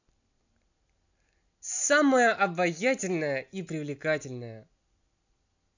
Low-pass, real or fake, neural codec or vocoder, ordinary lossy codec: 7.2 kHz; real; none; none